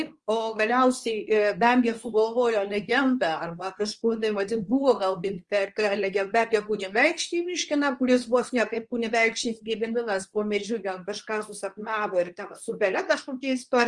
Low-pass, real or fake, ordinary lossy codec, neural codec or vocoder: 10.8 kHz; fake; Opus, 24 kbps; codec, 24 kHz, 0.9 kbps, WavTokenizer, medium speech release version 2